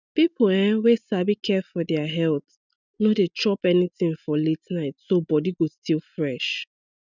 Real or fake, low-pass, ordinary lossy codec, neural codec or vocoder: real; 7.2 kHz; none; none